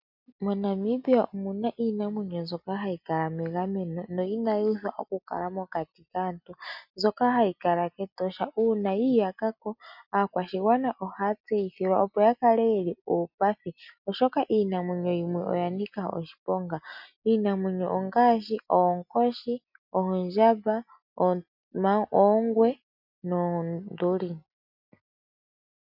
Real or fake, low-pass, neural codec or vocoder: real; 5.4 kHz; none